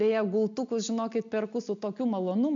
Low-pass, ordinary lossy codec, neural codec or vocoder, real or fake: 7.2 kHz; MP3, 64 kbps; none; real